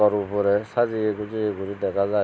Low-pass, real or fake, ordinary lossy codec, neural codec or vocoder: none; real; none; none